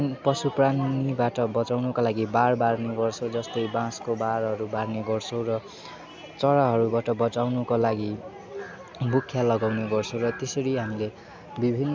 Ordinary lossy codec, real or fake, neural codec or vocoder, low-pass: none; real; none; none